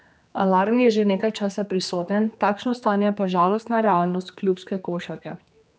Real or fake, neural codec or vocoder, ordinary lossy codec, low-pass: fake; codec, 16 kHz, 2 kbps, X-Codec, HuBERT features, trained on general audio; none; none